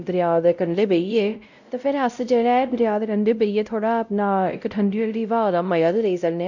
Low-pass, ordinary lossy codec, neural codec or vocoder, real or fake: 7.2 kHz; none; codec, 16 kHz, 0.5 kbps, X-Codec, WavLM features, trained on Multilingual LibriSpeech; fake